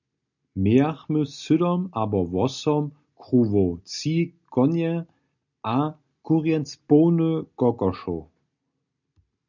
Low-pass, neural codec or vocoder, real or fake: 7.2 kHz; none; real